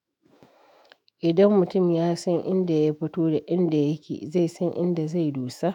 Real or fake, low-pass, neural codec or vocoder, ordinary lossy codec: fake; 19.8 kHz; autoencoder, 48 kHz, 128 numbers a frame, DAC-VAE, trained on Japanese speech; none